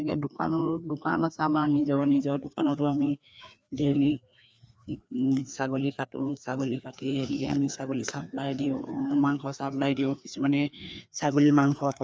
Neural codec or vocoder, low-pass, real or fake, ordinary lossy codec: codec, 16 kHz, 2 kbps, FreqCodec, larger model; none; fake; none